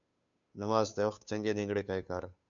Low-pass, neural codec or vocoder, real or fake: 7.2 kHz; codec, 16 kHz, 2 kbps, FunCodec, trained on Chinese and English, 25 frames a second; fake